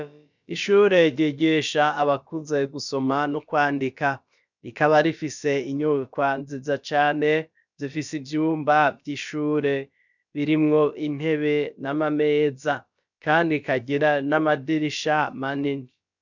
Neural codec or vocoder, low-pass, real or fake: codec, 16 kHz, about 1 kbps, DyCAST, with the encoder's durations; 7.2 kHz; fake